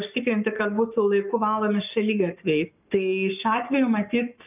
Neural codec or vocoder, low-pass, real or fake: codec, 44.1 kHz, 7.8 kbps, Pupu-Codec; 3.6 kHz; fake